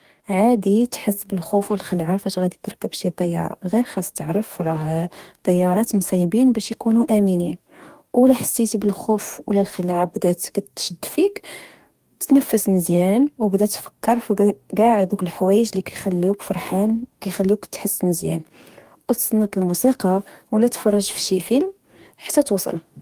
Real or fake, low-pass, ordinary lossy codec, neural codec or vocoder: fake; 14.4 kHz; Opus, 32 kbps; codec, 44.1 kHz, 2.6 kbps, DAC